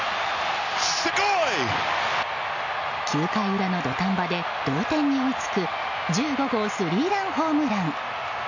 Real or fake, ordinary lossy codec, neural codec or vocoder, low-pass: real; none; none; 7.2 kHz